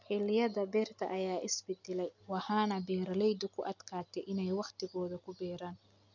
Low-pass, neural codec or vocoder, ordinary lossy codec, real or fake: 7.2 kHz; none; none; real